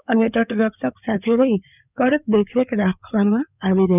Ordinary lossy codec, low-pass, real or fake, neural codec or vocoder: none; 3.6 kHz; fake; codec, 16 kHz in and 24 kHz out, 2.2 kbps, FireRedTTS-2 codec